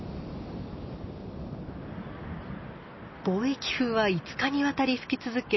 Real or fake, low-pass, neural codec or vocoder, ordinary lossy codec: real; 7.2 kHz; none; MP3, 24 kbps